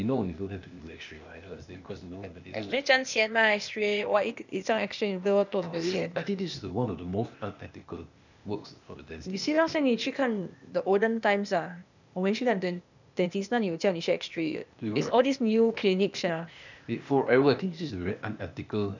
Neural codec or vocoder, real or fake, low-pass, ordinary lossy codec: codec, 16 kHz, 0.8 kbps, ZipCodec; fake; 7.2 kHz; none